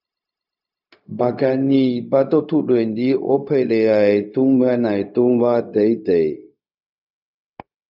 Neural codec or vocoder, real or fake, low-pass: codec, 16 kHz, 0.4 kbps, LongCat-Audio-Codec; fake; 5.4 kHz